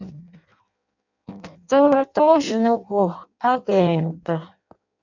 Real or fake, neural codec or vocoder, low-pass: fake; codec, 16 kHz in and 24 kHz out, 0.6 kbps, FireRedTTS-2 codec; 7.2 kHz